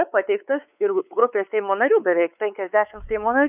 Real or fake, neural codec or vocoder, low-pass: fake; codec, 16 kHz, 4 kbps, X-Codec, WavLM features, trained on Multilingual LibriSpeech; 3.6 kHz